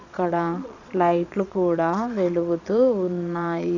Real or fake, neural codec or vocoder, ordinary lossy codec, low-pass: fake; vocoder, 22.05 kHz, 80 mel bands, Vocos; none; 7.2 kHz